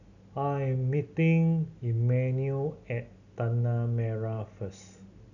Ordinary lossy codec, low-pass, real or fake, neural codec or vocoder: none; 7.2 kHz; real; none